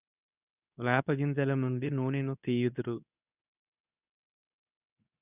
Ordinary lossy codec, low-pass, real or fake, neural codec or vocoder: none; 3.6 kHz; fake; codec, 24 kHz, 0.9 kbps, WavTokenizer, medium speech release version 2